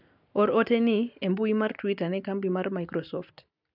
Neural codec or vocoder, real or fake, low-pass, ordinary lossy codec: none; real; 5.4 kHz; none